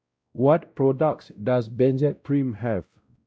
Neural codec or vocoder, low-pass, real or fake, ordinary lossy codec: codec, 16 kHz, 0.5 kbps, X-Codec, WavLM features, trained on Multilingual LibriSpeech; none; fake; none